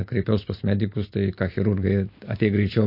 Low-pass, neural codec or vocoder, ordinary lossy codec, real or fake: 5.4 kHz; none; MP3, 32 kbps; real